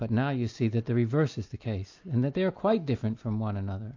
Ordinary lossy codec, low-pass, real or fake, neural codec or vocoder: AAC, 48 kbps; 7.2 kHz; real; none